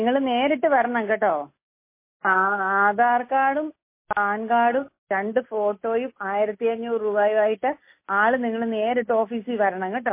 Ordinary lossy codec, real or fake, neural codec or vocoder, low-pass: MP3, 24 kbps; real; none; 3.6 kHz